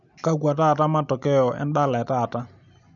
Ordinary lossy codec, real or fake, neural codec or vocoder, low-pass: none; real; none; 7.2 kHz